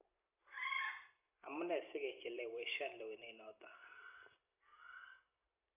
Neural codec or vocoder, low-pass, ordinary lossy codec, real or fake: vocoder, 44.1 kHz, 128 mel bands every 256 samples, BigVGAN v2; 3.6 kHz; AAC, 24 kbps; fake